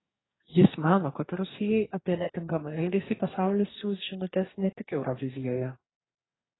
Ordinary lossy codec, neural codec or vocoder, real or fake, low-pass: AAC, 16 kbps; codec, 44.1 kHz, 2.6 kbps, DAC; fake; 7.2 kHz